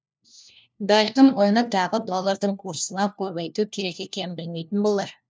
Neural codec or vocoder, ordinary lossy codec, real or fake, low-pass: codec, 16 kHz, 1 kbps, FunCodec, trained on LibriTTS, 50 frames a second; none; fake; none